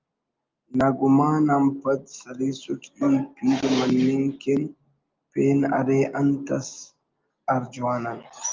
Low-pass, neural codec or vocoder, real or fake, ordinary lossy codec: 7.2 kHz; none; real; Opus, 24 kbps